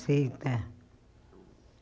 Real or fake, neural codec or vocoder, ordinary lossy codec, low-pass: real; none; none; none